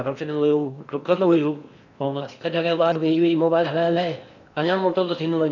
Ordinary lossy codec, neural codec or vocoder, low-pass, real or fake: none; codec, 16 kHz in and 24 kHz out, 0.8 kbps, FocalCodec, streaming, 65536 codes; 7.2 kHz; fake